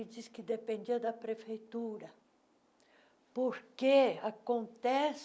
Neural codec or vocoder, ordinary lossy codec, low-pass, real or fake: none; none; none; real